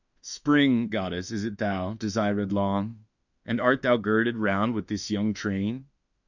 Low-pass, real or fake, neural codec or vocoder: 7.2 kHz; fake; autoencoder, 48 kHz, 32 numbers a frame, DAC-VAE, trained on Japanese speech